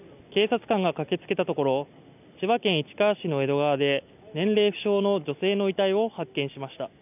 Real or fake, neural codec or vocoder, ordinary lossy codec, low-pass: real; none; none; 3.6 kHz